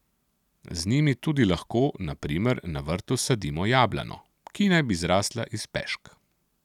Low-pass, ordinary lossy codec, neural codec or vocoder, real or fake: 19.8 kHz; none; none; real